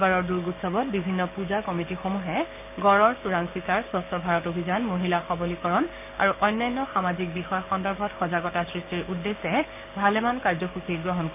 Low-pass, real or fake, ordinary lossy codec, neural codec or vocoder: 3.6 kHz; fake; none; codec, 16 kHz, 6 kbps, DAC